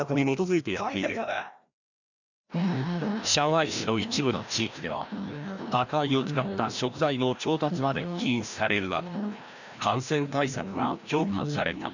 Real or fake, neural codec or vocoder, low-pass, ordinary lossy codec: fake; codec, 16 kHz, 1 kbps, FreqCodec, larger model; 7.2 kHz; none